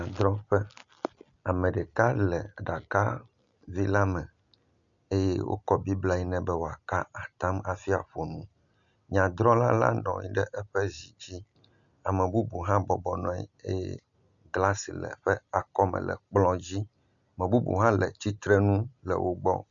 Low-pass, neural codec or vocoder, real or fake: 7.2 kHz; none; real